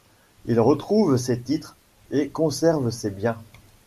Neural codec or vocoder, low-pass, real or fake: none; 14.4 kHz; real